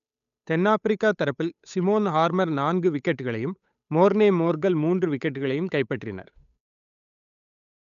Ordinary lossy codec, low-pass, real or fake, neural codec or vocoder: none; 7.2 kHz; fake; codec, 16 kHz, 8 kbps, FunCodec, trained on Chinese and English, 25 frames a second